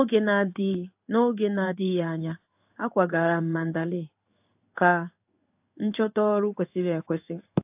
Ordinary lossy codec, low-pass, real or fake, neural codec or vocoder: AAC, 32 kbps; 3.6 kHz; fake; codec, 16 kHz in and 24 kHz out, 1 kbps, XY-Tokenizer